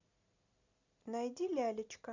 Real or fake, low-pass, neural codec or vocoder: real; 7.2 kHz; none